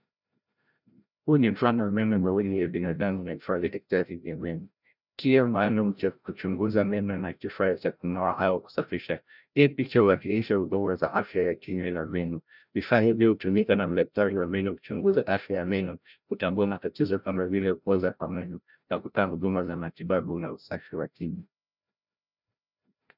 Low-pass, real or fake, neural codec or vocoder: 5.4 kHz; fake; codec, 16 kHz, 0.5 kbps, FreqCodec, larger model